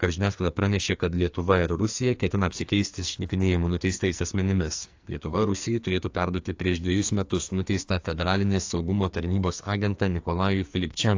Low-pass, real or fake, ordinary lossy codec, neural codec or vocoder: 7.2 kHz; fake; AAC, 48 kbps; codec, 44.1 kHz, 2.6 kbps, SNAC